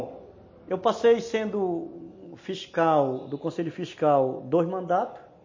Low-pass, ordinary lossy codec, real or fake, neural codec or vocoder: 7.2 kHz; MP3, 32 kbps; real; none